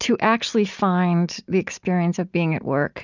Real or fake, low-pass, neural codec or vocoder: real; 7.2 kHz; none